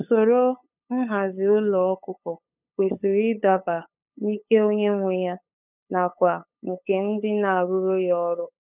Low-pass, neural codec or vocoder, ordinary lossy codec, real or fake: 3.6 kHz; codec, 16 kHz, 8 kbps, FunCodec, trained on LibriTTS, 25 frames a second; none; fake